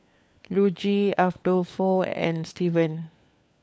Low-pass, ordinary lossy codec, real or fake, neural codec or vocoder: none; none; fake; codec, 16 kHz, 4 kbps, FunCodec, trained on LibriTTS, 50 frames a second